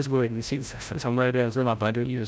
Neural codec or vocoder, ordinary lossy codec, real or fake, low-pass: codec, 16 kHz, 0.5 kbps, FreqCodec, larger model; none; fake; none